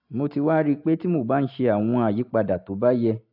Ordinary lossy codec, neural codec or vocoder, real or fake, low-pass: AAC, 48 kbps; none; real; 5.4 kHz